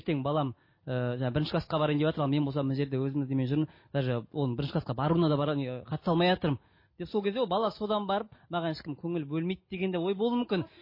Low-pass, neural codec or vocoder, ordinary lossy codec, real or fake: 5.4 kHz; none; MP3, 24 kbps; real